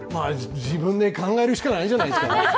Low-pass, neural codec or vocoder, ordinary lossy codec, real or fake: none; none; none; real